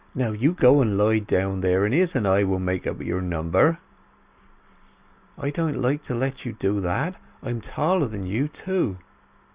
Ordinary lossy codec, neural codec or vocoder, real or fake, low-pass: Opus, 64 kbps; none; real; 3.6 kHz